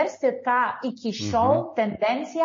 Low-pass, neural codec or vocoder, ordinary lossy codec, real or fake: 7.2 kHz; none; MP3, 32 kbps; real